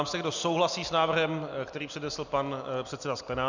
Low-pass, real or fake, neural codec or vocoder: 7.2 kHz; real; none